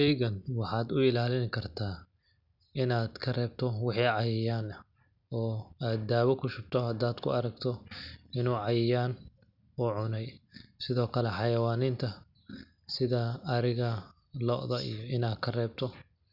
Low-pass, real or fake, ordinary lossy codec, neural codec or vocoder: 5.4 kHz; real; none; none